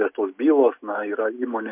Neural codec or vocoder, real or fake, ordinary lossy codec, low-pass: none; real; MP3, 32 kbps; 3.6 kHz